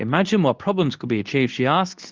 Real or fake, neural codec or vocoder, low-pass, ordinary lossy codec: fake; codec, 24 kHz, 0.9 kbps, WavTokenizer, medium speech release version 2; 7.2 kHz; Opus, 24 kbps